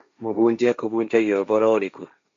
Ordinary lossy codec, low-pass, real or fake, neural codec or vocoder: AAC, 96 kbps; 7.2 kHz; fake; codec, 16 kHz, 1.1 kbps, Voila-Tokenizer